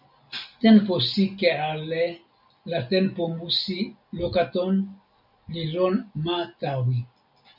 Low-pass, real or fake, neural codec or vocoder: 5.4 kHz; real; none